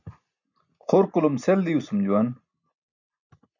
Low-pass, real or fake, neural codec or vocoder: 7.2 kHz; real; none